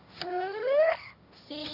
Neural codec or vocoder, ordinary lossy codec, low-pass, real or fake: codec, 16 kHz, 1.1 kbps, Voila-Tokenizer; none; 5.4 kHz; fake